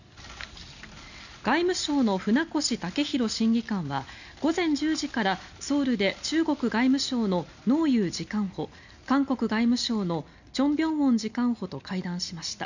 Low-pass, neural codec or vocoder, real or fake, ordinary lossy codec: 7.2 kHz; none; real; none